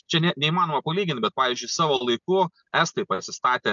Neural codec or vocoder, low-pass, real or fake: none; 7.2 kHz; real